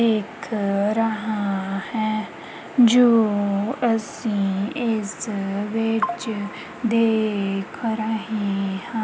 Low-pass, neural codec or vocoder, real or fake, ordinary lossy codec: none; none; real; none